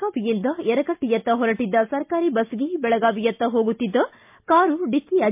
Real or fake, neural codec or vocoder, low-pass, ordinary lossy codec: real; none; 3.6 kHz; MP3, 32 kbps